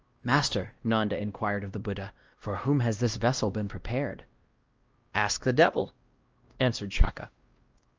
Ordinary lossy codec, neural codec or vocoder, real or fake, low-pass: Opus, 24 kbps; codec, 16 kHz, 1 kbps, X-Codec, WavLM features, trained on Multilingual LibriSpeech; fake; 7.2 kHz